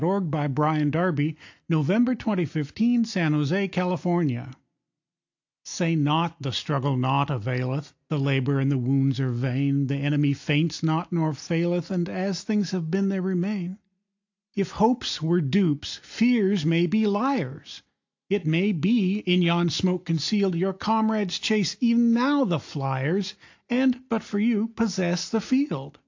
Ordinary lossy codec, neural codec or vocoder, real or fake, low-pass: AAC, 48 kbps; none; real; 7.2 kHz